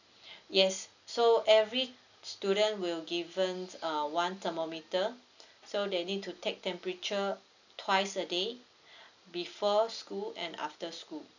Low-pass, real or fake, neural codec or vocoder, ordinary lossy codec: 7.2 kHz; real; none; none